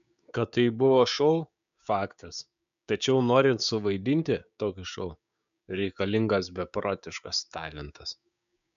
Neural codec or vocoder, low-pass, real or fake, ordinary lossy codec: codec, 16 kHz, 6 kbps, DAC; 7.2 kHz; fake; MP3, 96 kbps